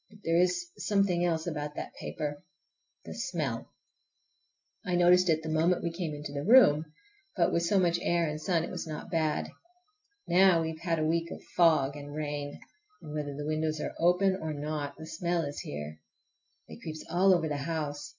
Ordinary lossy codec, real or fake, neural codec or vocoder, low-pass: MP3, 64 kbps; real; none; 7.2 kHz